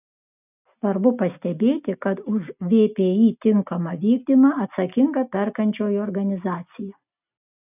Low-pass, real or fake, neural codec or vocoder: 3.6 kHz; real; none